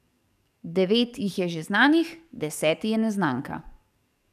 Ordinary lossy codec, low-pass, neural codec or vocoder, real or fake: none; 14.4 kHz; codec, 44.1 kHz, 7.8 kbps, DAC; fake